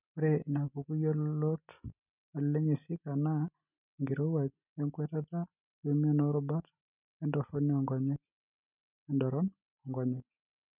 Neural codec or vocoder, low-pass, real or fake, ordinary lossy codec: none; 3.6 kHz; real; none